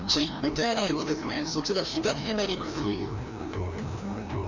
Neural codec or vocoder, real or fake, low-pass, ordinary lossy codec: codec, 16 kHz, 1 kbps, FreqCodec, larger model; fake; 7.2 kHz; none